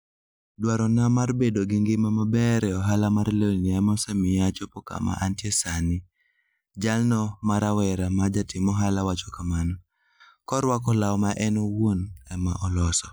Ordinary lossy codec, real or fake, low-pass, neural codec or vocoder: none; real; none; none